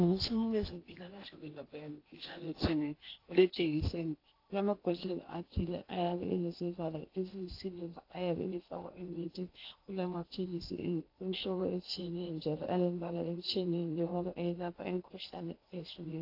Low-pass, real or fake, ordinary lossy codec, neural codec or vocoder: 5.4 kHz; fake; AAC, 32 kbps; codec, 16 kHz in and 24 kHz out, 0.8 kbps, FocalCodec, streaming, 65536 codes